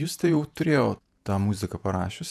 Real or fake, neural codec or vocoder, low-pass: fake; vocoder, 48 kHz, 128 mel bands, Vocos; 14.4 kHz